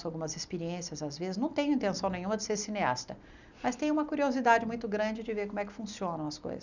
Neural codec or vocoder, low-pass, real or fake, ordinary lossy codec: none; 7.2 kHz; real; none